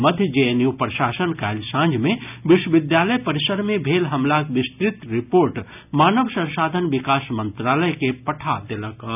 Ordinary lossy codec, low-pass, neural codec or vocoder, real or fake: none; 3.6 kHz; none; real